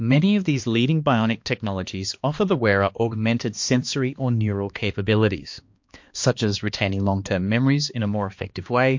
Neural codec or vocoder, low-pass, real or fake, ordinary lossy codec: codec, 16 kHz, 2 kbps, X-Codec, HuBERT features, trained on balanced general audio; 7.2 kHz; fake; MP3, 48 kbps